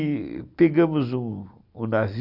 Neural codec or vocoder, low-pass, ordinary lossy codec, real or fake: none; 5.4 kHz; Opus, 64 kbps; real